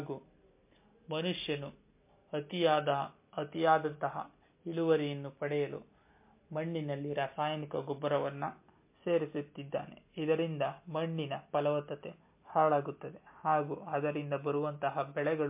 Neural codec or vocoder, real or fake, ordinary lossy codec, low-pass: none; real; MP3, 24 kbps; 3.6 kHz